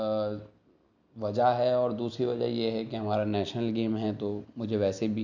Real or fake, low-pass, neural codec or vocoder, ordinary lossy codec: real; 7.2 kHz; none; none